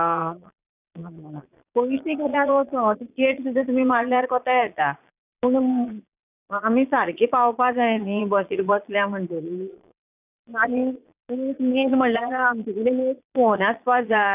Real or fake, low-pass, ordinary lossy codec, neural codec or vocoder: fake; 3.6 kHz; none; vocoder, 22.05 kHz, 80 mel bands, Vocos